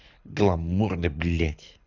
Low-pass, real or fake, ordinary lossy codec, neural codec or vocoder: 7.2 kHz; fake; none; codec, 24 kHz, 6 kbps, HILCodec